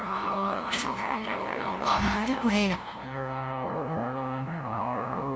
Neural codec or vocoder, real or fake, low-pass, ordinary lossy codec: codec, 16 kHz, 0.5 kbps, FunCodec, trained on LibriTTS, 25 frames a second; fake; none; none